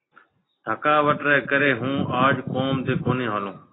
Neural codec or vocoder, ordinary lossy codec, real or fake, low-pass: none; AAC, 16 kbps; real; 7.2 kHz